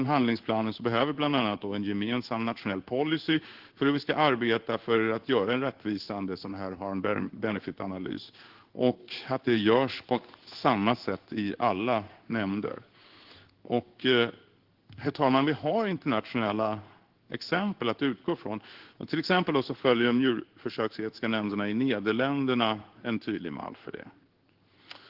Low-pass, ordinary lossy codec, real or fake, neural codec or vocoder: 5.4 kHz; Opus, 16 kbps; fake; codec, 16 kHz in and 24 kHz out, 1 kbps, XY-Tokenizer